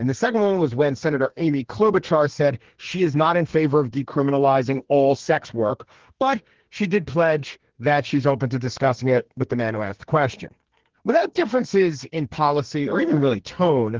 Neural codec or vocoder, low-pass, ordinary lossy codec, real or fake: codec, 32 kHz, 1.9 kbps, SNAC; 7.2 kHz; Opus, 16 kbps; fake